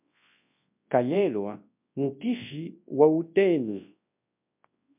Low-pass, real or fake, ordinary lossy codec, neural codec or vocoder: 3.6 kHz; fake; MP3, 32 kbps; codec, 24 kHz, 0.9 kbps, WavTokenizer, large speech release